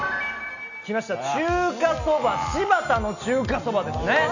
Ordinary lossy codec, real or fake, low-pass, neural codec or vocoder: none; real; 7.2 kHz; none